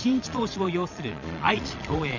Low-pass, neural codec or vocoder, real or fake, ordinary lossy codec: 7.2 kHz; vocoder, 22.05 kHz, 80 mel bands, Vocos; fake; none